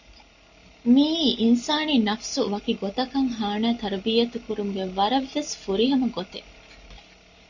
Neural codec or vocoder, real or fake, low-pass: none; real; 7.2 kHz